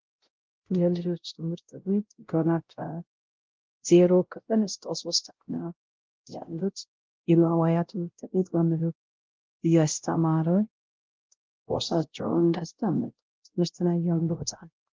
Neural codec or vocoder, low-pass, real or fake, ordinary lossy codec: codec, 16 kHz, 0.5 kbps, X-Codec, WavLM features, trained on Multilingual LibriSpeech; 7.2 kHz; fake; Opus, 16 kbps